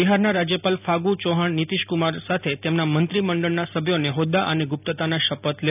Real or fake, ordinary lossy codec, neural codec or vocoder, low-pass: real; none; none; 3.6 kHz